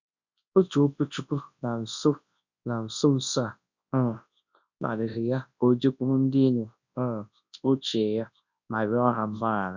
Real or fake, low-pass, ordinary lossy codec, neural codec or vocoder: fake; 7.2 kHz; none; codec, 24 kHz, 0.9 kbps, WavTokenizer, large speech release